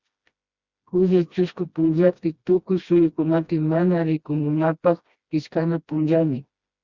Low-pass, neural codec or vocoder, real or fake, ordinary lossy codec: 7.2 kHz; codec, 16 kHz, 1 kbps, FreqCodec, smaller model; fake; Opus, 64 kbps